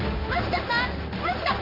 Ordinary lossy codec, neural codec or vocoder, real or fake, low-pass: none; codec, 16 kHz in and 24 kHz out, 1 kbps, XY-Tokenizer; fake; 5.4 kHz